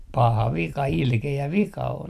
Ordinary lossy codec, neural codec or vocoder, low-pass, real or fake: none; vocoder, 44.1 kHz, 128 mel bands every 256 samples, BigVGAN v2; 14.4 kHz; fake